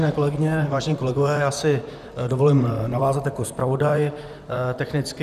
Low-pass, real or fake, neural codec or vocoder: 14.4 kHz; fake; vocoder, 44.1 kHz, 128 mel bands, Pupu-Vocoder